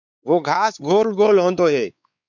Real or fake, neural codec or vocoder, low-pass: fake; codec, 16 kHz, 4 kbps, X-Codec, WavLM features, trained on Multilingual LibriSpeech; 7.2 kHz